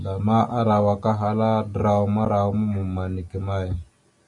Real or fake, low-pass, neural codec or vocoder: real; 10.8 kHz; none